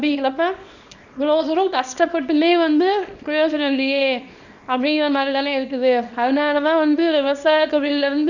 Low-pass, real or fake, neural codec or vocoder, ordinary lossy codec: 7.2 kHz; fake; codec, 24 kHz, 0.9 kbps, WavTokenizer, small release; none